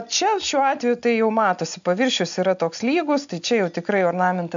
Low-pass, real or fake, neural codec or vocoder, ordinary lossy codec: 7.2 kHz; real; none; MP3, 64 kbps